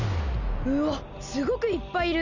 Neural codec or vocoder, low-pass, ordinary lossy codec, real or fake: none; 7.2 kHz; none; real